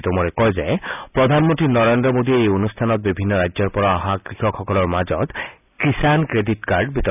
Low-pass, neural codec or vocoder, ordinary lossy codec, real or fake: 3.6 kHz; none; none; real